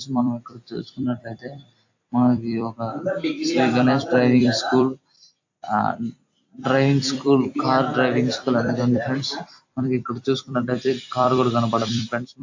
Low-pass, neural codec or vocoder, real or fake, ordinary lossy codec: 7.2 kHz; vocoder, 44.1 kHz, 128 mel bands every 256 samples, BigVGAN v2; fake; AAC, 48 kbps